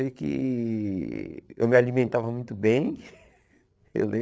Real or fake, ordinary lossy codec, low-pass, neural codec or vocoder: fake; none; none; codec, 16 kHz, 8 kbps, FreqCodec, larger model